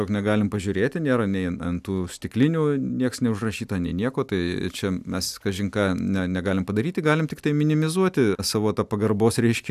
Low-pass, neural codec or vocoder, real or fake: 14.4 kHz; none; real